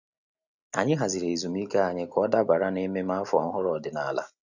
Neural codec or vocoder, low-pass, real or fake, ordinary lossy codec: none; 7.2 kHz; real; none